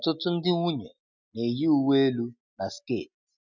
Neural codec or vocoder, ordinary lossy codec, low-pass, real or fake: none; none; 7.2 kHz; real